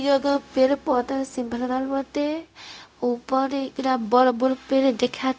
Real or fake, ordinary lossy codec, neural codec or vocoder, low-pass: fake; none; codec, 16 kHz, 0.4 kbps, LongCat-Audio-Codec; none